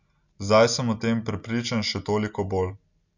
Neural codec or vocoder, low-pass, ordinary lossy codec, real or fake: none; 7.2 kHz; none; real